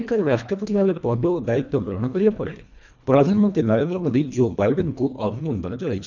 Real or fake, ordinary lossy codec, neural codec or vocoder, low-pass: fake; none; codec, 24 kHz, 1.5 kbps, HILCodec; 7.2 kHz